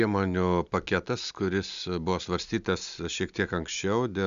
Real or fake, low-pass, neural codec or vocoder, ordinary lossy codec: real; 7.2 kHz; none; Opus, 64 kbps